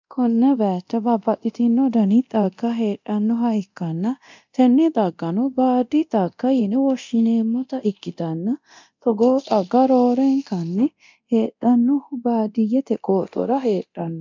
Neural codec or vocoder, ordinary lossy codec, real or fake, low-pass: codec, 24 kHz, 0.9 kbps, DualCodec; MP3, 64 kbps; fake; 7.2 kHz